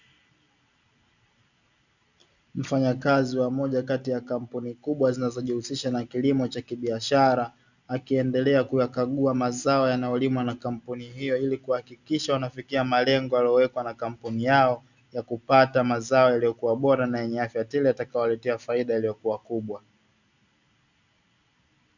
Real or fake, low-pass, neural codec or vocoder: real; 7.2 kHz; none